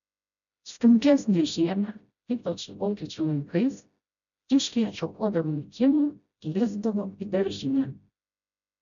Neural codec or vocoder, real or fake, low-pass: codec, 16 kHz, 0.5 kbps, FreqCodec, smaller model; fake; 7.2 kHz